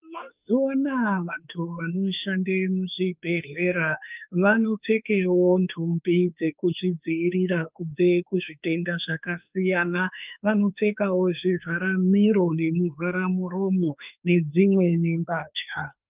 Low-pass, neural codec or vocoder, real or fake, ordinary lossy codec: 3.6 kHz; autoencoder, 48 kHz, 32 numbers a frame, DAC-VAE, trained on Japanese speech; fake; Opus, 24 kbps